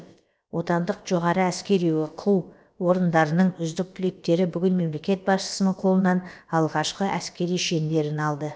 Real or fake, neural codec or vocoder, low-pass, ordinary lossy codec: fake; codec, 16 kHz, about 1 kbps, DyCAST, with the encoder's durations; none; none